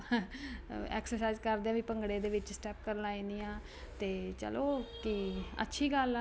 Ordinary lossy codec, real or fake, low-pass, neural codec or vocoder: none; real; none; none